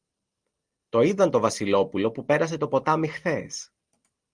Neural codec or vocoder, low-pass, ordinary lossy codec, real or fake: none; 9.9 kHz; Opus, 24 kbps; real